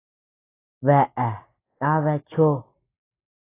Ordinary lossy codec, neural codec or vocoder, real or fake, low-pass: AAC, 16 kbps; none; real; 3.6 kHz